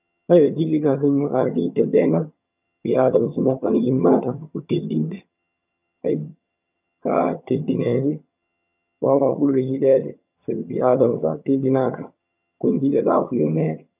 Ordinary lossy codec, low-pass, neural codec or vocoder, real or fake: none; 3.6 kHz; vocoder, 22.05 kHz, 80 mel bands, HiFi-GAN; fake